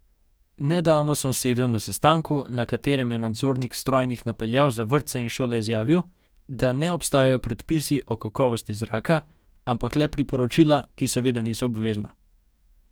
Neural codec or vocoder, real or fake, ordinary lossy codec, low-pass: codec, 44.1 kHz, 2.6 kbps, DAC; fake; none; none